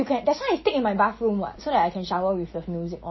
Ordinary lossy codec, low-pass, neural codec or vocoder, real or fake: MP3, 24 kbps; 7.2 kHz; none; real